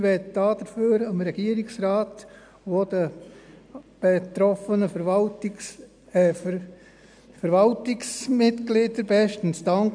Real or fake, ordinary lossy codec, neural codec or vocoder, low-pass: real; none; none; 9.9 kHz